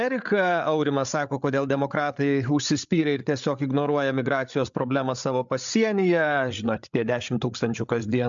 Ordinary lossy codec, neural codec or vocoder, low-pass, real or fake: MP3, 64 kbps; codec, 16 kHz, 8 kbps, FreqCodec, larger model; 7.2 kHz; fake